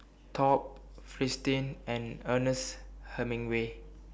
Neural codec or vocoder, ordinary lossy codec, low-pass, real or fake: none; none; none; real